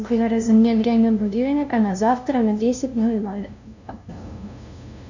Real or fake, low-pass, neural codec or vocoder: fake; 7.2 kHz; codec, 16 kHz, 0.5 kbps, FunCodec, trained on LibriTTS, 25 frames a second